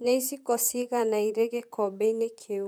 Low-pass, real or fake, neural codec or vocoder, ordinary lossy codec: none; fake; vocoder, 44.1 kHz, 128 mel bands, Pupu-Vocoder; none